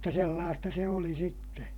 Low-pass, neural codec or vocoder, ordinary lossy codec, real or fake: 19.8 kHz; vocoder, 44.1 kHz, 128 mel bands every 512 samples, BigVGAN v2; none; fake